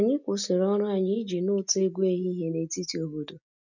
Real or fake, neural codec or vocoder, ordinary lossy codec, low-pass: real; none; none; 7.2 kHz